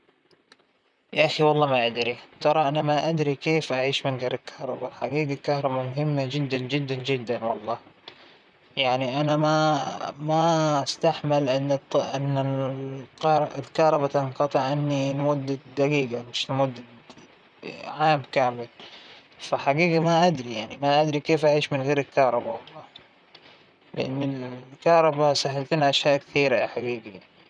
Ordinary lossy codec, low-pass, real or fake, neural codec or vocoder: none; 9.9 kHz; fake; vocoder, 44.1 kHz, 128 mel bands, Pupu-Vocoder